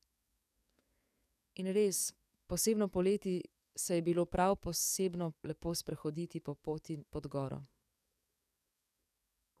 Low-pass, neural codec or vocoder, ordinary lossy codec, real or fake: 14.4 kHz; codec, 44.1 kHz, 7.8 kbps, DAC; none; fake